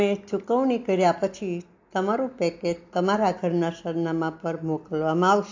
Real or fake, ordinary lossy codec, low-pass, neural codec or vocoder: real; AAC, 48 kbps; 7.2 kHz; none